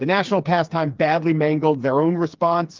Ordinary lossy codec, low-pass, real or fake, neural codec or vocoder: Opus, 32 kbps; 7.2 kHz; fake; codec, 16 kHz, 4 kbps, FreqCodec, smaller model